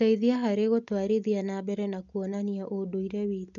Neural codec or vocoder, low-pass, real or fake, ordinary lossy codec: none; 7.2 kHz; real; none